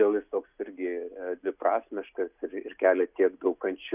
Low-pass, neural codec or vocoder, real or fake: 3.6 kHz; none; real